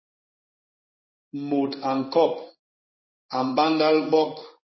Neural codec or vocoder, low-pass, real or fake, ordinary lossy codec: codec, 16 kHz in and 24 kHz out, 1 kbps, XY-Tokenizer; 7.2 kHz; fake; MP3, 24 kbps